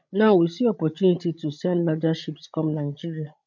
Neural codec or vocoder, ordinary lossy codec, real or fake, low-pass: codec, 16 kHz, 16 kbps, FreqCodec, larger model; none; fake; 7.2 kHz